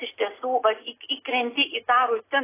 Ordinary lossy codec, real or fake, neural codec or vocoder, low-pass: AAC, 16 kbps; real; none; 3.6 kHz